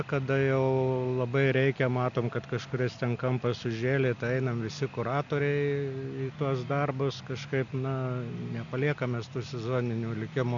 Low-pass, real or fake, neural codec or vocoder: 7.2 kHz; real; none